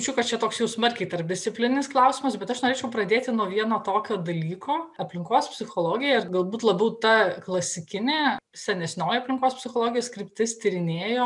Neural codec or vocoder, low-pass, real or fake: none; 10.8 kHz; real